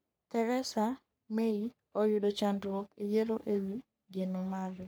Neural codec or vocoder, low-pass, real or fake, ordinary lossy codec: codec, 44.1 kHz, 3.4 kbps, Pupu-Codec; none; fake; none